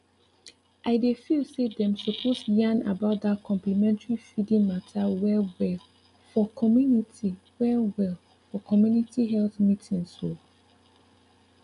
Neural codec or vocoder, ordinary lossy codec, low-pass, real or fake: none; none; 10.8 kHz; real